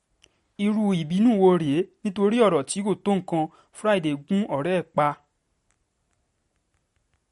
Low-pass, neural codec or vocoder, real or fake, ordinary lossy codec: 19.8 kHz; none; real; MP3, 48 kbps